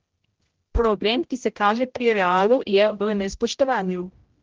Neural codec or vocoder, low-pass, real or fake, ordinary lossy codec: codec, 16 kHz, 0.5 kbps, X-Codec, HuBERT features, trained on general audio; 7.2 kHz; fake; Opus, 16 kbps